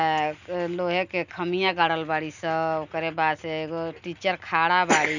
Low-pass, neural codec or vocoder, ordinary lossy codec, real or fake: 7.2 kHz; none; none; real